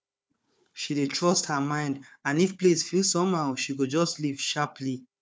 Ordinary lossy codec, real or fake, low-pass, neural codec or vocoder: none; fake; none; codec, 16 kHz, 4 kbps, FunCodec, trained on Chinese and English, 50 frames a second